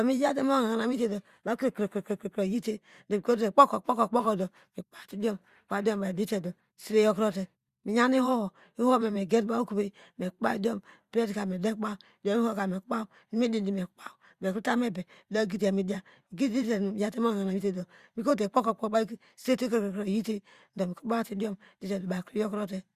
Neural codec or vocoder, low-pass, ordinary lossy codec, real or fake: vocoder, 44.1 kHz, 128 mel bands every 512 samples, BigVGAN v2; 14.4 kHz; Opus, 64 kbps; fake